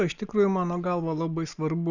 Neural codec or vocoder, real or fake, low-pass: none; real; 7.2 kHz